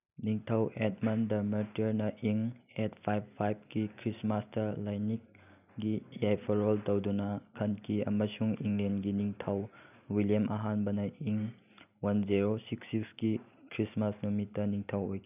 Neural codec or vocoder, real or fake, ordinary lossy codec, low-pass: none; real; none; 3.6 kHz